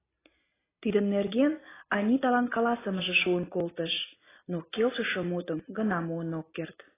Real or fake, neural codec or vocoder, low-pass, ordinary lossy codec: real; none; 3.6 kHz; AAC, 16 kbps